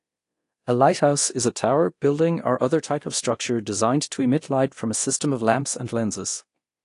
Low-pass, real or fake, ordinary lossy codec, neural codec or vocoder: 10.8 kHz; fake; AAC, 48 kbps; codec, 24 kHz, 0.9 kbps, DualCodec